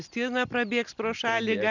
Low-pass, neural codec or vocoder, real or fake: 7.2 kHz; none; real